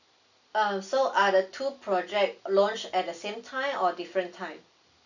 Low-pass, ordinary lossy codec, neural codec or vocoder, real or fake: 7.2 kHz; none; none; real